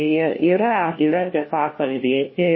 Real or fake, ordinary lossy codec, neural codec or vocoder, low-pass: fake; MP3, 24 kbps; codec, 16 kHz, 1 kbps, FunCodec, trained on LibriTTS, 50 frames a second; 7.2 kHz